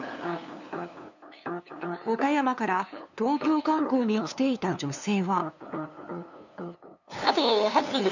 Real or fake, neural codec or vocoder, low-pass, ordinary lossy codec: fake; codec, 16 kHz, 2 kbps, FunCodec, trained on LibriTTS, 25 frames a second; 7.2 kHz; none